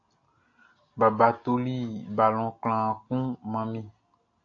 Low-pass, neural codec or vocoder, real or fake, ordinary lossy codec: 7.2 kHz; none; real; AAC, 48 kbps